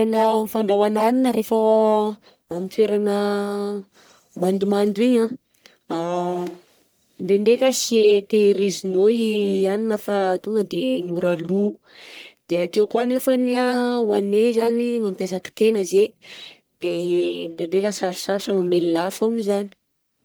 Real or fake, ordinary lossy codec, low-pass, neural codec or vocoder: fake; none; none; codec, 44.1 kHz, 1.7 kbps, Pupu-Codec